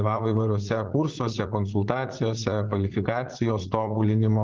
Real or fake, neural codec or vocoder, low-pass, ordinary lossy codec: fake; vocoder, 44.1 kHz, 80 mel bands, Vocos; 7.2 kHz; Opus, 16 kbps